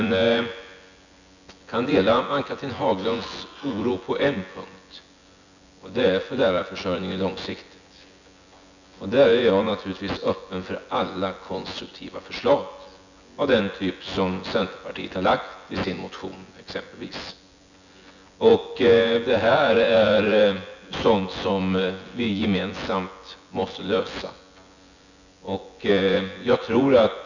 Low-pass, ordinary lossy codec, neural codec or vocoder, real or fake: 7.2 kHz; none; vocoder, 24 kHz, 100 mel bands, Vocos; fake